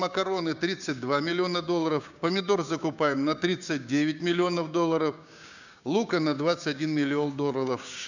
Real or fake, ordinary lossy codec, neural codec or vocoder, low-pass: fake; none; codec, 16 kHz, 6 kbps, DAC; 7.2 kHz